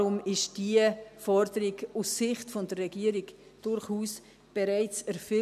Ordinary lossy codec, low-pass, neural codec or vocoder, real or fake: none; 14.4 kHz; none; real